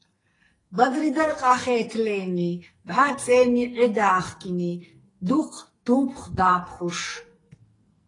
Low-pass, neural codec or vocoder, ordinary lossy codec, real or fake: 10.8 kHz; codec, 44.1 kHz, 2.6 kbps, SNAC; AAC, 32 kbps; fake